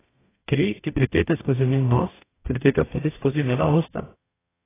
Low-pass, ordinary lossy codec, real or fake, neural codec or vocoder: 3.6 kHz; AAC, 16 kbps; fake; codec, 44.1 kHz, 0.9 kbps, DAC